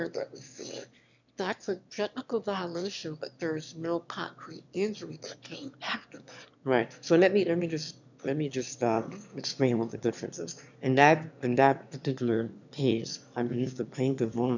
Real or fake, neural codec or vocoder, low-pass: fake; autoencoder, 22.05 kHz, a latent of 192 numbers a frame, VITS, trained on one speaker; 7.2 kHz